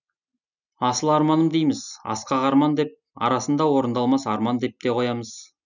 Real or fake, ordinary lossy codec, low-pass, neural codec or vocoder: real; none; 7.2 kHz; none